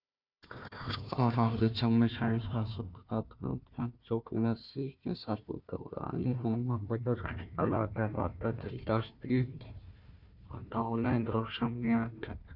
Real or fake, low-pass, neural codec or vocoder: fake; 5.4 kHz; codec, 16 kHz, 1 kbps, FunCodec, trained on Chinese and English, 50 frames a second